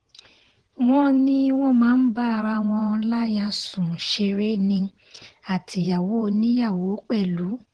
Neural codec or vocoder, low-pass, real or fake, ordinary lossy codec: vocoder, 22.05 kHz, 80 mel bands, WaveNeXt; 9.9 kHz; fake; Opus, 16 kbps